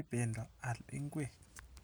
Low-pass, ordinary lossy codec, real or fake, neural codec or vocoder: none; none; real; none